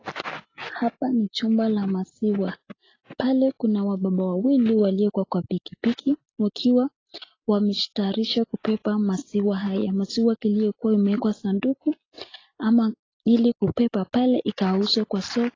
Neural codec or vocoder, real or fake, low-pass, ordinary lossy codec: none; real; 7.2 kHz; AAC, 32 kbps